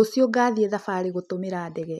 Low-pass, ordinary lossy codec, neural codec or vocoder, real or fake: 14.4 kHz; none; none; real